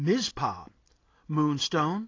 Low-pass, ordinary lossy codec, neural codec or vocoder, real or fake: 7.2 kHz; AAC, 32 kbps; none; real